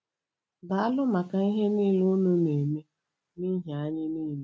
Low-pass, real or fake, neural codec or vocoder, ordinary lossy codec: none; real; none; none